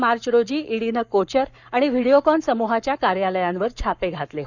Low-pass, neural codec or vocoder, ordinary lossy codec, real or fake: 7.2 kHz; codec, 44.1 kHz, 7.8 kbps, Pupu-Codec; none; fake